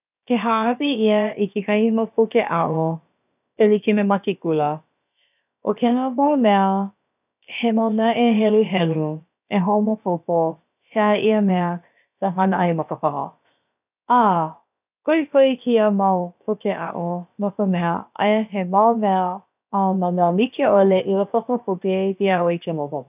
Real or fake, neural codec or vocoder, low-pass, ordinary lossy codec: fake; codec, 16 kHz, about 1 kbps, DyCAST, with the encoder's durations; 3.6 kHz; none